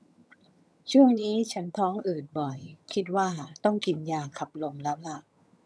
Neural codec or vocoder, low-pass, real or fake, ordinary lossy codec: vocoder, 22.05 kHz, 80 mel bands, HiFi-GAN; none; fake; none